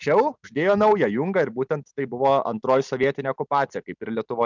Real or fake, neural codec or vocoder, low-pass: real; none; 7.2 kHz